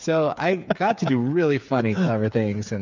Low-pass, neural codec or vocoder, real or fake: 7.2 kHz; codec, 16 kHz, 8 kbps, FreqCodec, smaller model; fake